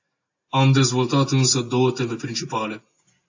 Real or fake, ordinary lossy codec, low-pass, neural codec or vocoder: real; AAC, 48 kbps; 7.2 kHz; none